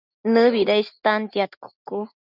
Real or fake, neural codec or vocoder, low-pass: real; none; 5.4 kHz